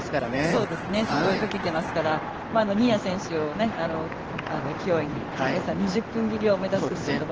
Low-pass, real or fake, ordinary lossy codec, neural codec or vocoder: 7.2 kHz; fake; Opus, 16 kbps; codec, 16 kHz in and 24 kHz out, 1 kbps, XY-Tokenizer